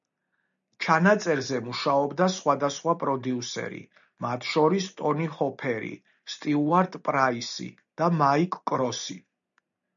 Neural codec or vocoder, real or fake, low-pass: none; real; 7.2 kHz